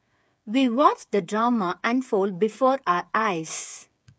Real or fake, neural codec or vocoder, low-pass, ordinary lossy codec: fake; codec, 16 kHz, 8 kbps, FreqCodec, smaller model; none; none